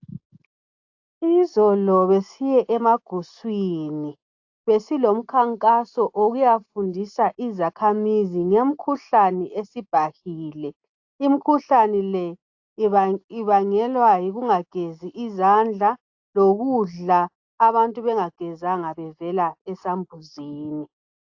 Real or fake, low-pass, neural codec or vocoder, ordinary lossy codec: real; 7.2 kHz; none; AAC, 48 kbps